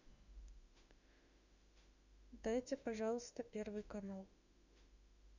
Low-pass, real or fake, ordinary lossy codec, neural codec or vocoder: 7.2 kHz; fake; none; autoencoder, 48 kHz, 32 numbers a frame, DAC-VAE, trained on Japanese speech